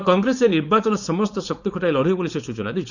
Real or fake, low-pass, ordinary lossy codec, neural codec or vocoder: fake; 7.2 kHz; none; codec, 16 kHz, 4.8 kbps, FACodec